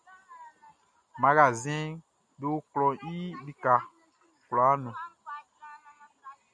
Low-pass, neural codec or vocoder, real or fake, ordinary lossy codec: 9.9 kHz; none; real; MP3, 96 kbps